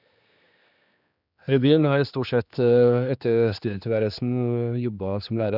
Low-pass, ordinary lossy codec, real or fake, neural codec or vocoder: 5.4 kHz; none; fake; codec, 16 kHz, 2 kbps, X-Codec, HuBERT features, trained on general audio